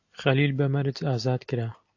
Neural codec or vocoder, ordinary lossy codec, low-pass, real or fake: none; AAC, 48 kbps; 7.2 kHz; real